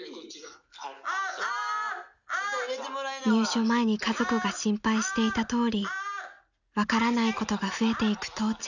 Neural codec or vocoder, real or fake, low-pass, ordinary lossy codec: none; real; 7.2 kHz; none